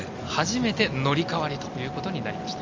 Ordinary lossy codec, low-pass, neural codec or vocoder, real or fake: Opus, 32 kbps; 7.2 kHz; none; real